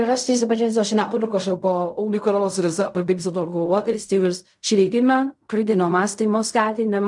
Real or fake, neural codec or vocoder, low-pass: fake; codec, 16 kHz in and 24 kHz out, 0.4 kbps, LongCat-Audio-Codec, fine tuned four codebook decoder; 10.8 kHz